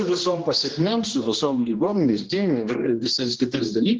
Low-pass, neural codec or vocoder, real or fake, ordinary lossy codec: 7.2 kHz; codec, 16 kHz, 1 kbps, X-Codec, HuBERT features, trained on general audio; fake; Opus, 16 kbps